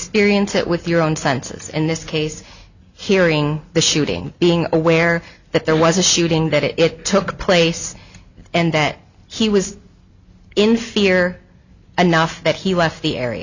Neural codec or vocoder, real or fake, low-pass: none; real; 7.2 kHz